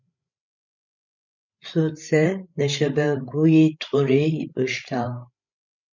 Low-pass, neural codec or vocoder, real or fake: 7.2 kHz; codec, 16 kHz, 16 kbps, FreqCodec, larger model; fake